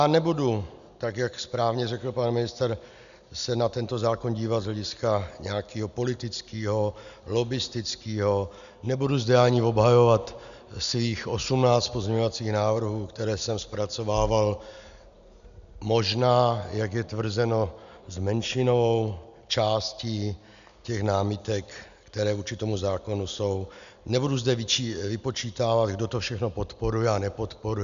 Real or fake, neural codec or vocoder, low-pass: real; none; 7.2 kHz